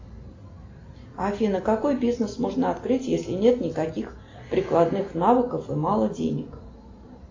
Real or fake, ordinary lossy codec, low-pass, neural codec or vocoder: real; AAC, 32 kbps; 7.2 kHz; none